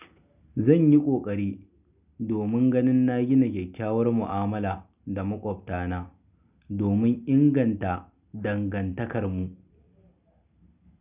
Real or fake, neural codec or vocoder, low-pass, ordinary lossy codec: real; none; 3.6 kHz; none